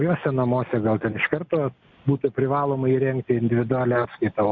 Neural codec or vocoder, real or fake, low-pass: none; real; 7.2 kHz